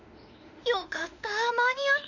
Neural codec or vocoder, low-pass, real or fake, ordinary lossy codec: autoencoder, 48 kHz, 32 numbers a frame, DAC-VAE, trained on Japanese speech; 7.2 kHz; fake; none